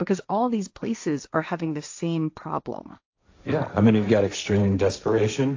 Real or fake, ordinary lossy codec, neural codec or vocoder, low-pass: fake; AAC, 48 kbps; codec, 16 kHz, 1.1 kbps, Voila-Tokenizer; 7.2 kHz